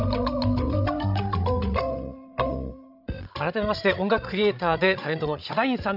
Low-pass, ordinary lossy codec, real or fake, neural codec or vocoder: 5.4 kHz; none; fake; codec, 16 kHz, 16 kbps, FreqCodec, larger model